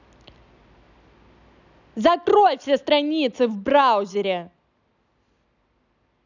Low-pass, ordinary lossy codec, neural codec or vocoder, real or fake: 7.2 kHz; none; none; real